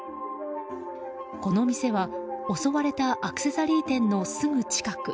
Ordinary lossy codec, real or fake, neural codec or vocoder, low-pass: none; real; none; none